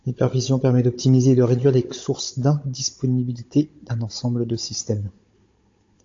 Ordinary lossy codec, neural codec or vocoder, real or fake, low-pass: AAC, 48 kbps; codec, 16 kHz, 16 kbps, FunCodec, trained on Chinese and English, 50 frames a second; fake; 7.2 kHz